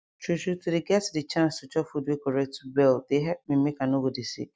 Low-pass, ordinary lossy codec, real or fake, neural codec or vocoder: none; none; real; none